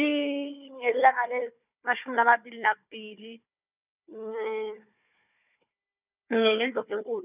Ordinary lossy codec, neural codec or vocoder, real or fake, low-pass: none; codec, 16 kHz, 4 kbps, FunCodec, trained on Chinese and English, 50 frames a second; fake; 3.6 kHz